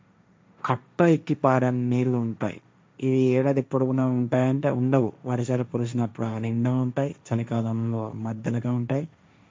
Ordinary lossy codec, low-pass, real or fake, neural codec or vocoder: none; none; fake; codec, 16 kHz, 1.1 kbps, Voila-Tokenizer